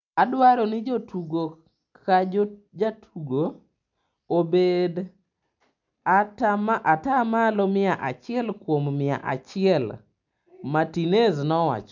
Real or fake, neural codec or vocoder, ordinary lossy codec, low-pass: real; none; MP3, 64 kbps; 7.2 kHz